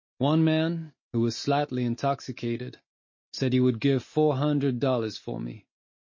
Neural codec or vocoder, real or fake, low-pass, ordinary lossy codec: none; real; 7.2 kHz; MP3, 32 kbps